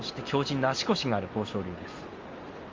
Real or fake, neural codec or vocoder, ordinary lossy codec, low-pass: real; none; Opus, 32 kbps; 7.2 kHz